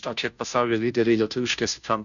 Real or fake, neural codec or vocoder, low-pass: fake; codec, 16 kHz, 0.5 kbps, FunCodec, trained on Chinese and English, 25 frames a second; 7.2 kHz